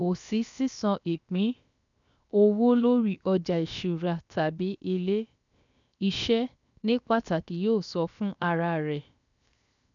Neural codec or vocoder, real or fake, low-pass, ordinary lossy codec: codec, 16 kHz, 0.7 kbps, FocalCodec; fake; 7.2 kHz; none